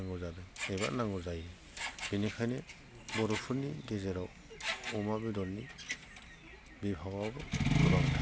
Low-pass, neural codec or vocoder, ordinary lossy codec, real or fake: none; none; none; real